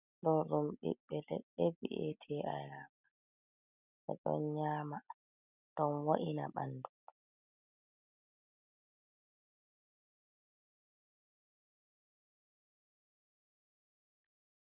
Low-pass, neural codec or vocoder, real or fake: 3.6 kHz; none; real